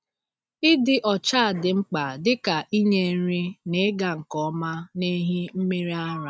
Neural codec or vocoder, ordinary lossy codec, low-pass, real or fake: none; none; none; real